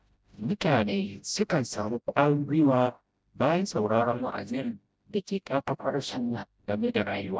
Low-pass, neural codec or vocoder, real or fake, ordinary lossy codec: none; codec, 16 kHz, 0.5 kbps, FreqCodec, smaller model; fake; none